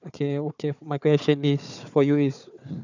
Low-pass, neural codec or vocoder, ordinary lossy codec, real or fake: 7.2 kHz; codec, 16 kHz, 4 kbps, FunCodec, trained on Chinese and English, 50 frames a second; none; fake